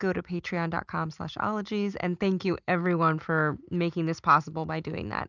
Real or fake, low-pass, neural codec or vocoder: real; 7.2 kHz; none